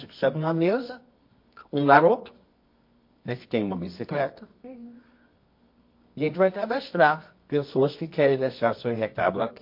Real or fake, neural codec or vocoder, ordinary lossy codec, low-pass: fake; codec, 24 kHz, 0.9 kbps, WavTokenizer, medium music audio release; MP3, 32 kbps; 5.4 kHz